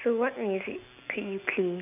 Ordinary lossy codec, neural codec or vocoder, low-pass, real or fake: none; none; 3.6 kHz; real